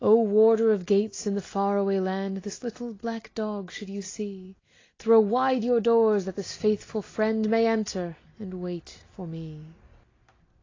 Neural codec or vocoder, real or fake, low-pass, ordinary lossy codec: none; real; 7.2 kHz; AAC, 32 kbps